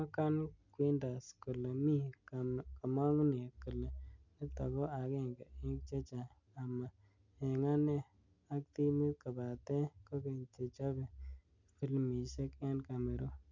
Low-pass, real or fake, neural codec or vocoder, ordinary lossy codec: 7.2 kHz; real; none; none